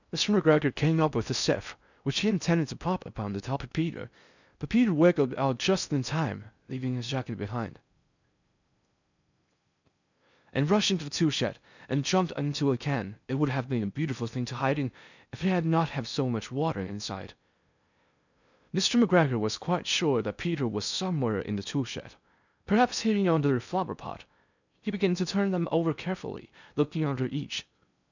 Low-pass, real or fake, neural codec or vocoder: 7.2 kHz; fake; codec, 16 kHz in and 24 kHz out, 0.6 kbps, FocalCodec, streaming, 4096 codes